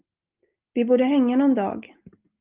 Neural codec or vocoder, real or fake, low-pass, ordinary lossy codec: none; real; 3.6 kHz; Opus, 24 kbps